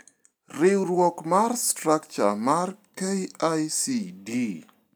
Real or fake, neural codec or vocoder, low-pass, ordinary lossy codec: real; none; none; none